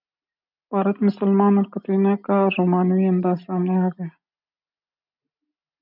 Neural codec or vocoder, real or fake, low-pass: none; real; 5.4 kHz